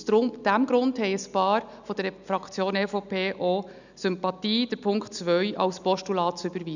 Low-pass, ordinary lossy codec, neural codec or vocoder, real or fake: 7.2 kHz; none; none; real